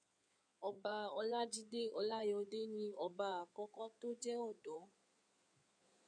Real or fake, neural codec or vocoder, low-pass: fake; codec, 16 kHz in and 24 kHz out, 2.2 kbps, FireRedTTS-2 codec; 9.9 kHz